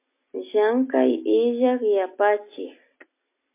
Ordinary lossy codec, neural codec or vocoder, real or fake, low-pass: MP3, 24 kbps; none; real; 3.6 kHz